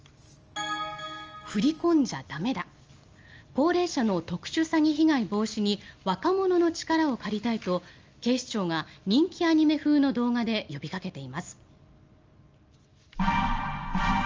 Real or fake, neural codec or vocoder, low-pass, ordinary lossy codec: real; none; 7.2 kHz; Opus, 24 kbps